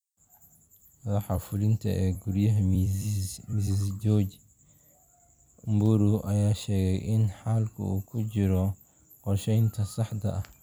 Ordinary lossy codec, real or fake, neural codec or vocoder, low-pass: none; fake; vocoder, 44.1 kHz, 128 mel bands every 256 samples, BigVGAN v2; none